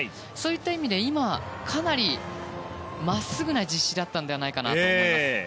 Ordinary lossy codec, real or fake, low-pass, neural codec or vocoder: none; real; none; none